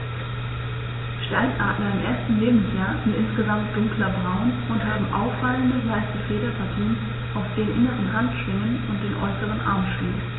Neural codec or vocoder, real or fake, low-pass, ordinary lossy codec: none; real; 7.2 kHz; AAC, 16 kbps